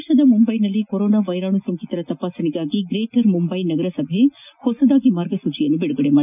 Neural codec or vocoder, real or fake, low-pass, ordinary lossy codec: none; real; 3.6 kHz; none